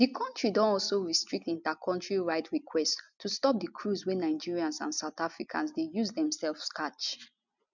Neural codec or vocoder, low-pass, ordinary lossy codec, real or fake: none; 7.2 kHz; none; real